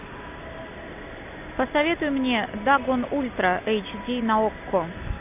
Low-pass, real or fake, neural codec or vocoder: 3.6 kHz; real; none